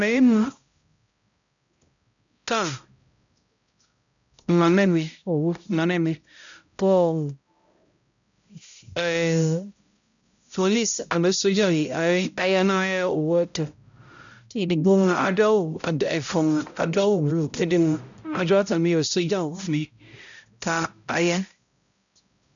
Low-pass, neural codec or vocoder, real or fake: 7.2 kHz; codec, 16 kHz, 0.5 kbps, X-Codec, HuBERT features, trained on balanced general audio; fake